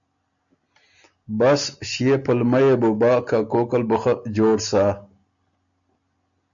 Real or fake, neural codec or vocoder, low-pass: real; none; 7.2 kHz